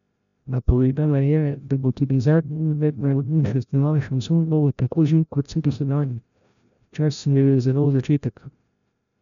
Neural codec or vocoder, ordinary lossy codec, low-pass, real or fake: codec, 16 kHz, 0.5 kbps, FreqCodec, larger model; none; 7.2 kHz; fake